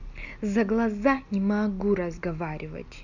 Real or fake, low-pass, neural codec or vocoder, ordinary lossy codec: real; 7.2 kHz; none; none